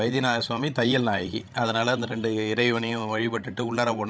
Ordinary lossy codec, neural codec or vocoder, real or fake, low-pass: none; codec, 16 kHz, 16 kbps, FreqCodec, larger model; fake; none